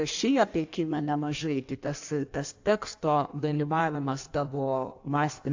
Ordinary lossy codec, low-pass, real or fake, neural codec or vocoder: AAC, 48 kbps; 7.2 kHz; fake; codec, 16 kHz in and 24 kHz out, 1.1 kbps, FireRedTTS-2 codec